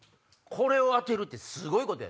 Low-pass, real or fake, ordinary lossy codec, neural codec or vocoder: none; real; none; none